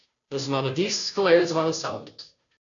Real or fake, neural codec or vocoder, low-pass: fake; codec, 16 kHz, 0.5 kbps, FunCodec, trained on Chinese and English, 25 frames a second; 7.2 kHz